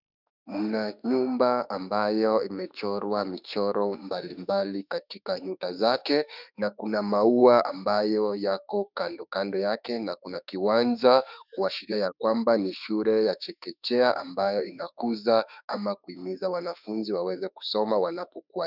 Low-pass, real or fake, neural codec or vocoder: 5.4 kHz; fake; autoencoder, 48 kHz, 32 numbers a frame, DAC-VAE, trained on Japanese speech